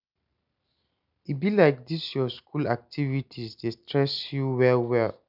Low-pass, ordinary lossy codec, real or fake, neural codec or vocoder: 5.4 kHz; none; real; none